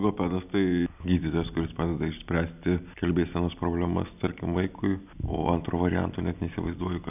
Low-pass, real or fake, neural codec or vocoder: 3.6 kHz; real; none